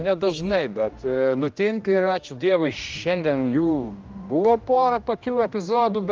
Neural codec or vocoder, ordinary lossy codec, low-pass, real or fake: codec, 16 kHz, 1 kbps, X-Codec, HuBERT features, trained on general audio; Opus, 24 kbps; 7.2 kHz; fake